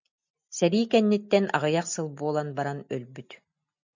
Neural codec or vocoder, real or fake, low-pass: none; real; 7.2 kHz